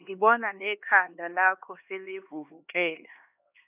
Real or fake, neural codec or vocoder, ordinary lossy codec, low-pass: fake; codec, 16 kHz, 2 kbps, X-Codec, HuBERT features, trained on LibriSpeech; none; 3.6 kHz